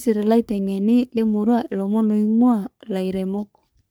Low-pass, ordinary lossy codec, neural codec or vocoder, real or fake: none; none; codec, 44.1 kHz, 3.4 kbps, Pupu-Codec; fake